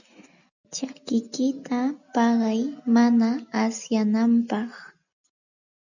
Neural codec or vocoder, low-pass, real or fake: none; 7.2 kHz; real